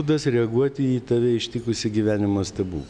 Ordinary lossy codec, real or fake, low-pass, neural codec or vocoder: MP3, 96 kbps; real; 9.9 kHz; none